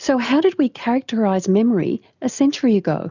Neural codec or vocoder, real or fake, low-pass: none; real; 7.2 kHz